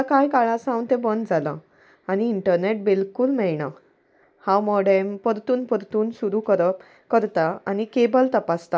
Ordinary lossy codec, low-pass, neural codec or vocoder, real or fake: none; none; none; real